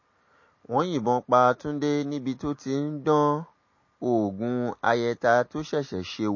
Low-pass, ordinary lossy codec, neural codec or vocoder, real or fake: 7.2 kHz; MP3, 32 kbps; none; real